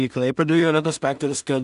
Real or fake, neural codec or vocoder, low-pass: fake; codec, 16 kHz in and 24 kHz out, 0.4 kbps, LongCat-Audio-Codec, two codebook decoder; 10.8 kHz